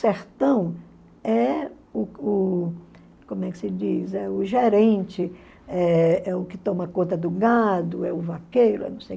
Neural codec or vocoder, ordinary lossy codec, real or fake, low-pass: none; none; real; none